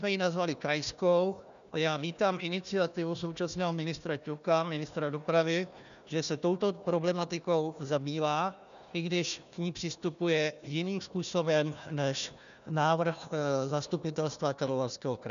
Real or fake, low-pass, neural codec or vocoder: fake; 7.2 kHz; codec, 16 kHz, 1 kbps, FunCodec, trained on Chinese and English, 50 frames a second